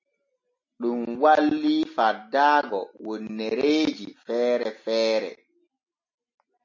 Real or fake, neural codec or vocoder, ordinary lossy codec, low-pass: real; none; MP3, 32 kbps; 7.2 kHz